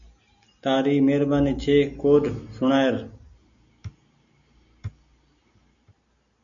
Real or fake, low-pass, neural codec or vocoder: real; 7.2 kHz; none